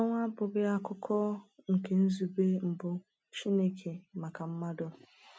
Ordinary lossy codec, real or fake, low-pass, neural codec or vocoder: none; real; none; none